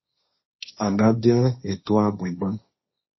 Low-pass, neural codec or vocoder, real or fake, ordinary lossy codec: 7.2 kHz; codec, 16 kHz, 1.1 kbps, Voila-Tokenizer; fake; MP3, 24 kbps